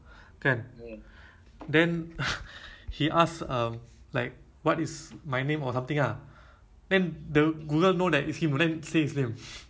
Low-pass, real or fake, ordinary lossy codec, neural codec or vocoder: none; real; none; none